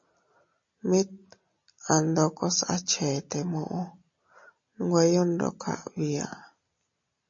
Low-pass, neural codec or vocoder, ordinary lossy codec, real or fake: 7.2 kHz; none; MP3, 32 kbps; real